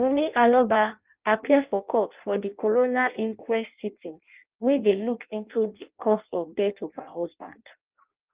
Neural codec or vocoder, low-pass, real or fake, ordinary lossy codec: codec, 16 kHz in and 24 kHz out, 0.6 kbps, FireRedTTS-2 codec; 3.6 kHz; fake; Opus, 32 kbps